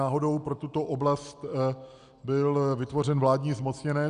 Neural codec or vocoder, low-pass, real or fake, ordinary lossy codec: none; 9.9 kHz; real; Opus, 64 kbps